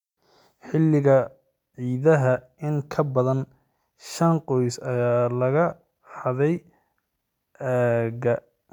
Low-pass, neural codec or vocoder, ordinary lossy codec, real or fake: 19.8 kHz; none; none; real